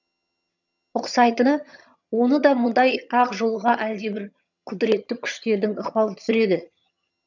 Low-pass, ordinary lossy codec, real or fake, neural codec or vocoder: 7.2 kHz; none; fake; vocoder, 22.05 kHz, 80 mel bands, HiFi-GAN